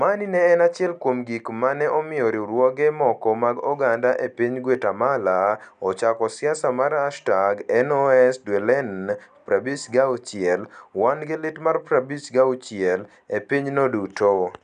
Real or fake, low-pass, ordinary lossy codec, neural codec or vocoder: real; 9.9 kHz; none; none